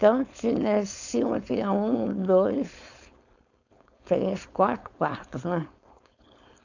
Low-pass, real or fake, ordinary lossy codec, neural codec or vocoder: 7.2 kHz; fake; none; codec, 16 kHz, 4.8 kbps, FACodec